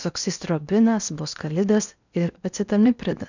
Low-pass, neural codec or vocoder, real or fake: 7.2 kHz; codec, 16 kHz in and 24 kHz out, 0.8 kbps, FocalCodec, streaming, 65536 codes; fake